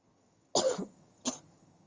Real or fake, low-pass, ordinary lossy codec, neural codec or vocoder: fake; 7.2 kHz; Opus, 32 kbps; vocoder, 22.05 kHz, 80 mel bands, HiFi-GAN